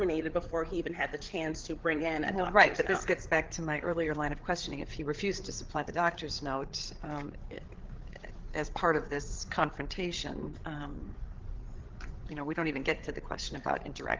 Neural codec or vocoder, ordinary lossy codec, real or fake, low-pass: codec, 16 kHz, 8 kbps, FreqCodec, larger model; Opus, 16 kbps; fake; 7.2 kHz